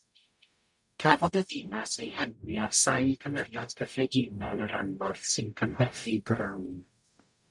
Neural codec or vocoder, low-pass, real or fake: codec, 44.1 kHz, 0.9 kbps, DAC; 10.8 kHz; fake